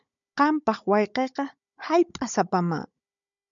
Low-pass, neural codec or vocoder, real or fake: 7.2 kHz; codec, 16 kHz, 16 kbps, FunCodec, trained on Chinese and English, 50 frames a second; fake